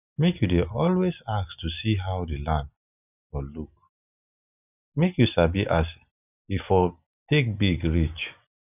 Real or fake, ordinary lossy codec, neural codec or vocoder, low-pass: real; none; none; 3.6 kHz